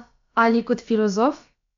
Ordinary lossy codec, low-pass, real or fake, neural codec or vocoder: MP3, 64 kbps; 7.2 kHz; fake; codec, 16 kHz, about 1 kbps, DyCAST, with the encoder's durations